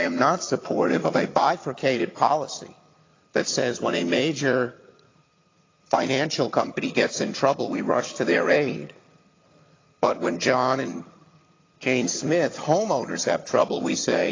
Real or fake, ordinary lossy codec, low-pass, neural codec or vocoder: fake; AAC, 32 kbps; 7.2 kHz; vocoder, 22.05 kHz, 80 mel bands, HiFi-GAN